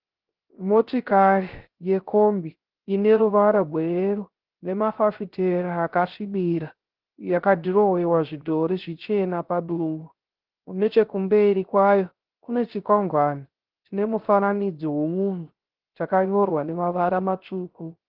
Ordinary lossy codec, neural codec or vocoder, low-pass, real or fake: Opus, 16 kbps; codec, 16 kHz, 0.3 kbps, FocalCodec; 5.4 kHz; fake